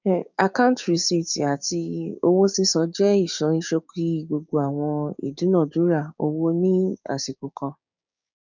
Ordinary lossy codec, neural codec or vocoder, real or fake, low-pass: none; codec, 16 kHz, 6 kbps, DAC; fake; 7.2 kHz